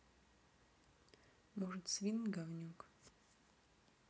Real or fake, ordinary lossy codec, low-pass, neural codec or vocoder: real; none; none; none